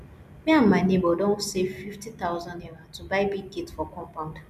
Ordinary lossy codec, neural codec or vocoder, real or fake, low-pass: none; none; real; 14.4 kHz